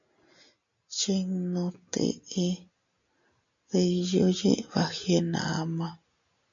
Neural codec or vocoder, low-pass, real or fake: none; 7.2 kHz; real